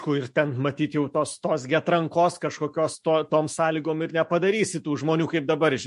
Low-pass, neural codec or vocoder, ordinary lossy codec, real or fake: 14.4 kHz; autoencoder, 48 kHz, 128 numbers a frame, DAC-VAE, trained on Japanese speech; MP3, 48 kbps; fake